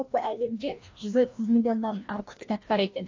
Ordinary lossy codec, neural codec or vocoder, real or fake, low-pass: AAC, 48 kbps; codec, 16 kHz, 1 kbps, FreqCodec, larger model; fake; 7.2 kHz